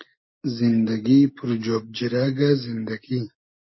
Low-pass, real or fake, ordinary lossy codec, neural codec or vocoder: 7.2 kHz; real; MP3, 24 kbps; none